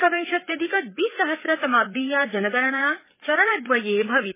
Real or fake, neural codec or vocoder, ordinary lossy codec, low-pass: fake; codec, 16 kHz, 4 kbps, FreqCodec, larger model; MP3, 16 kbps; 3.6 kHz